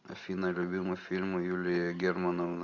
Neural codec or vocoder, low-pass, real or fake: none; 7.2 kHz; real